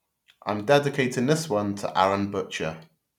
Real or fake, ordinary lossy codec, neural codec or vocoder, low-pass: real; none; none; 19.8 kHz